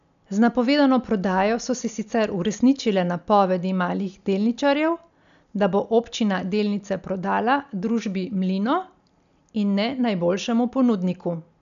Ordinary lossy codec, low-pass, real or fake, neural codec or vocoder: none; 7.2 kHz; real; none